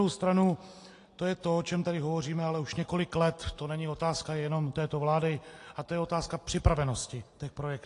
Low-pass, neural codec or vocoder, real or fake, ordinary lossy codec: 10.8 kHz; none; real; AAC, 48 kbps